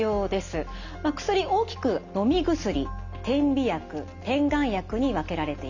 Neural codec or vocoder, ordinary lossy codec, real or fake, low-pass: none; none; real; 7.2 kHz